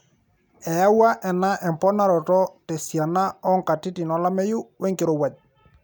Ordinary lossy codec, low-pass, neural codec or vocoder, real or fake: none; 19.8 kHz; none; real